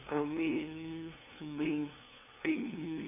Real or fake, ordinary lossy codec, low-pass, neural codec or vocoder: fake; none; 3.6 kHz; codec, 16 kHz, 2 kbps, FunCodec, trained on LibriTTS, 25 frames a second